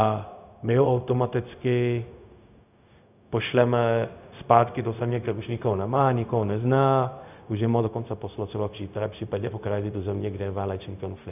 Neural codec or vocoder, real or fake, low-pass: codec, 16 kHz, 0.4 kbps, LongCat-Audio-Codec; fake; 3.6 kHz